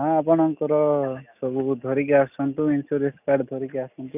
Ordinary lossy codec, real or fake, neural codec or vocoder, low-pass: none; real; none; 3.6 kHz